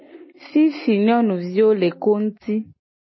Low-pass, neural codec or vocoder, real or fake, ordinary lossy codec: 7.2 kHz; none; real; MP3, 24 kbps